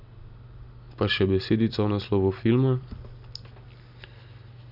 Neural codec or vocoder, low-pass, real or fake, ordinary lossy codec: none; 5.4 kHz; real; none